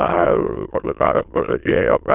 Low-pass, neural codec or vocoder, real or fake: 3.6 kHz; autoencoder, 22.05 kHz, a latent of 192 numbers a frame, VITS, trained on many speakers; fake